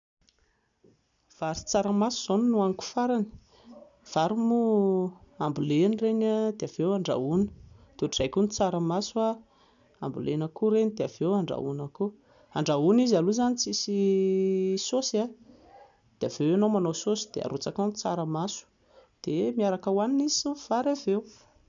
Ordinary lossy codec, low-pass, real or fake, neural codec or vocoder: none; 7.2 kHz; real; none